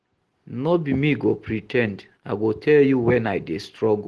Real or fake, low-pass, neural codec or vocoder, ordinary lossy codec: real; 10.8 kHz; none; Opus, 16 kbps